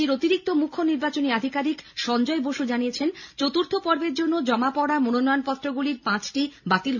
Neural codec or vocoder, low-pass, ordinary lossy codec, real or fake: none; 7.2 kHz; none; real